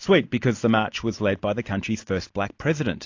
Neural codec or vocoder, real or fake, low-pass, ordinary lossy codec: none; real; 7.2 kHz; AAC, 48 kbps